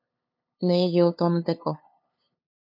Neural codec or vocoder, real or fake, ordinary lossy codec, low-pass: codec, 16 kHz, 2 kbps, FunCodec, trained on LibriTTS, 25 frames a second; fake; MP3, 48 kbps; 5.4 kHz